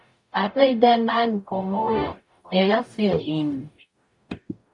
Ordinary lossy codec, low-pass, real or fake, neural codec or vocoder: MP3, 64 kbps; 10.8 kHz; fake; codec, 44.1 kHz, 0.9 kbps, DAC